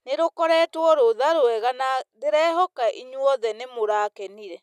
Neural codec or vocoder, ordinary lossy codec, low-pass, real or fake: none; none; 14.4 kHz; real